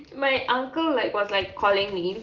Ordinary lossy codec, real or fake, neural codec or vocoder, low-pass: Opus, 32 kbps; real; none; 7.2 kHz